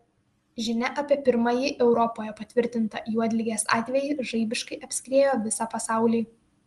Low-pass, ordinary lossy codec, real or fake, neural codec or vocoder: 10.8 kHz; Opus, 24 kbps; real; none